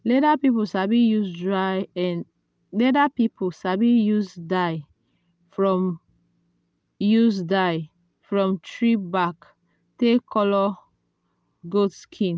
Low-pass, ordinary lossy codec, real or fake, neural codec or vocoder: none; none; real; none